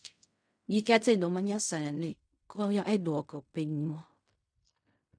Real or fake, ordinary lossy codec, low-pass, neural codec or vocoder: fake; none; 9.9 kHz; codec, 16 kHz in and 24 kHz out, 0.4 kbps, LongCat-Audio-Codec, fine tuned four codebook decoder